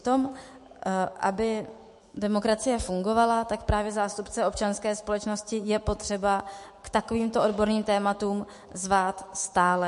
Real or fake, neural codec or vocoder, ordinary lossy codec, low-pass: fake; autoencoder, 48 kHz, 128 numbers a frame, DAC-VAE, trained on Japanese speech; MP3, 48 kbps; 14.4 kHz